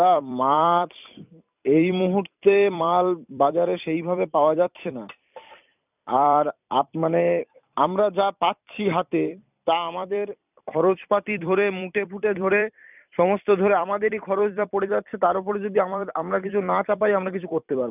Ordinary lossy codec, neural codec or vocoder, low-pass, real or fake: none; vocoder, 44.1 kHz, 128 mel bands every 256 samples, BigVGAN v2; 3.6 kHz; fake